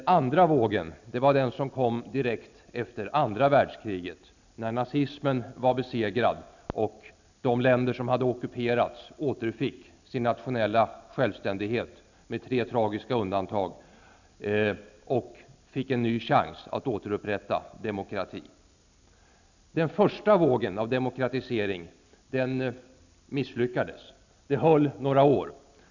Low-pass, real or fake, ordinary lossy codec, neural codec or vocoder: 7.2 kHz; real; none; none